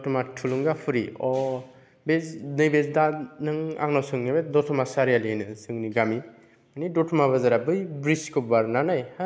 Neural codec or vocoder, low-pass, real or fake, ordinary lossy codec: none; none; real; none